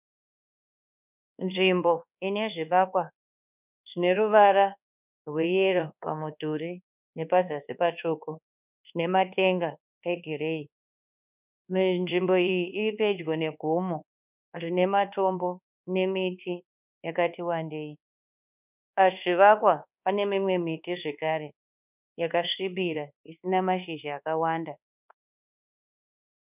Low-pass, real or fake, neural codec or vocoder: 3.6 kHz; fake; codec, 24 kHz, 1.2 kbps, DualCodec